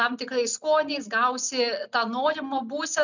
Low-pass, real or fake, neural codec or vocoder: 7.2 kHz; real; none